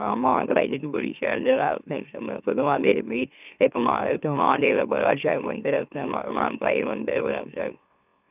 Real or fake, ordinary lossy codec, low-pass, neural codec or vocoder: fake; none; 3.6 kHz; autoencoder, 44.1 kHz, a latent of 192 numbers a frame, MeloTTS